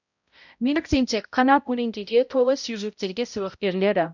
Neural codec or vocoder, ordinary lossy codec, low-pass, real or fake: codec, 16 kHz, 0.5 kbps, X-Codec, HuBERT features, trained on balanced general audio; none; 7.2 kHz; fake